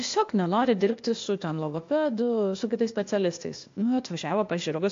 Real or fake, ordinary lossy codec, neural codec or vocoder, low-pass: fake; AAC, 48 kbps; codec, 16 kHz, 0.8 kbps, ZipCodec; 7.2 kHz